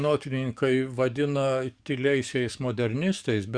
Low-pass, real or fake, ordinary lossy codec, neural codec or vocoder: 9.9 kHz; fake; Opus, 64 kbps; codec, 44.1 kHz, 7.8 kbps, DAC